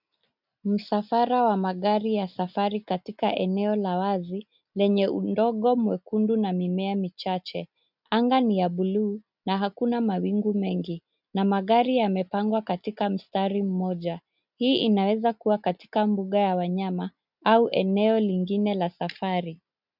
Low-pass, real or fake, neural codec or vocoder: 5.4 kHz; real; none